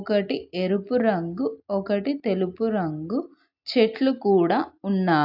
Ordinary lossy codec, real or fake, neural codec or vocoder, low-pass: AAC, 48 kbps; real; none; 5.4 kHz